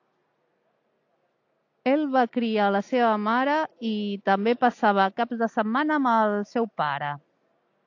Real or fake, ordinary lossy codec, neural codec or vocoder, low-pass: real; AAC, 48 kbps; none; 7.2 kHz